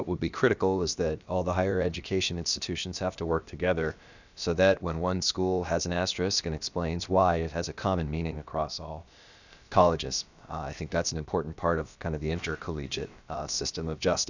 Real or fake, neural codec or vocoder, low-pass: fake; codec, 16 kHz, about 1 kbps, DyCAST, with the encoder's durations; 7.2 kHz